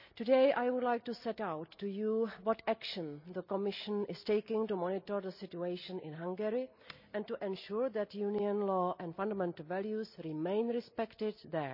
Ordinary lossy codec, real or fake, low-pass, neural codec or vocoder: none; real; 5.4 kHz; none